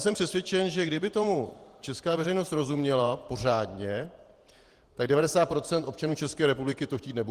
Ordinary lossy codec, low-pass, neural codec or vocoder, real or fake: Opus, 24 kbps; 14.4 kHz; vocoder, 48 kHz, 128 mel bands, Vocos; fake